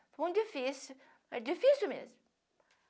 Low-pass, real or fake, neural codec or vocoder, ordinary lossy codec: none; real; none; none